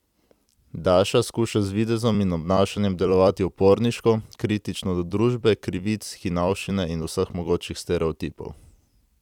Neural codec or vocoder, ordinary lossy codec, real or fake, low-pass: vocoder, 44.1 kHz, 128 mel bands every 256 samples, BigVGAN v2; none; fake; 19.8 kHz